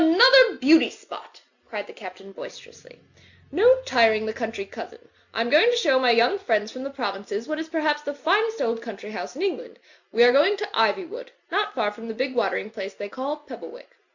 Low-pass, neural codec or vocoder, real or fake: 7.2 kHz; none; real